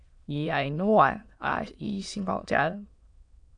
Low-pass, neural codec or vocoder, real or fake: 9.9 kHz; autoencoder, 22.05 kHz, a latent of 192 numbers a frame, VITS, trained on many speakers; fake